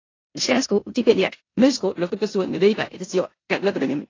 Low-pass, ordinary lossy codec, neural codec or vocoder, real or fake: 7.2 kHz; AAC, 32 kbps; codec, 16 kHz in and 24 kHz out, 0.9 kbps, LongCat-Audio-Codec, four codebook decoder; fake